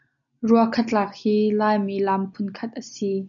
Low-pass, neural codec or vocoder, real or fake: 7.2 kHz; none; real